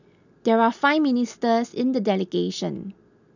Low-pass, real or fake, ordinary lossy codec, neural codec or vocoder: 7.2 kHz; real; none; none